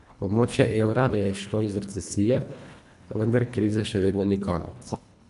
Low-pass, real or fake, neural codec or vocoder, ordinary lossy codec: 10.8 kHz; fake; codec, 24 kHz, 1.5 kbps, HILCodec; none